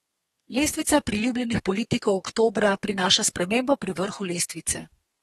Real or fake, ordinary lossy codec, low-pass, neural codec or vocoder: fake; AAC, 32 kbps; 14.4 kHz; codec, 32 kHz, 1.9 kbps, SNAC